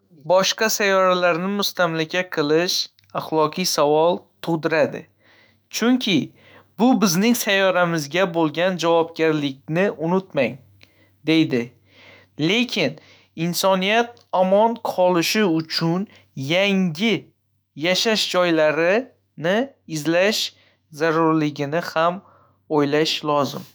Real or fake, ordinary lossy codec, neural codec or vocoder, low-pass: fake; none; autoencoder, 48 kHz, 128 numbers a frame, DAC-VAE, trained on Japanese speech; none